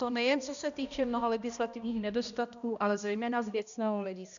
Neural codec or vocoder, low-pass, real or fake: codec, 16 kHz, 1 kbps, X-Codec, HuBERT features, trained on balanced general audio; 7.2 kHz; fake